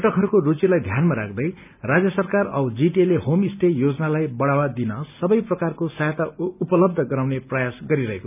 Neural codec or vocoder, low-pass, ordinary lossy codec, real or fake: none; 3.6 kHz; none; real